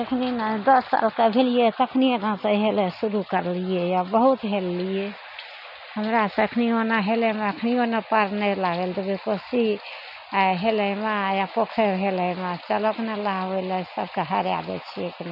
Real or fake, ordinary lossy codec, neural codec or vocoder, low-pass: real; none; none; 5.4 kHz